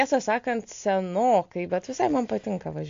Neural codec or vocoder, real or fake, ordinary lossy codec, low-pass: none; real; AAC, 48 kbps; 7.2 kHz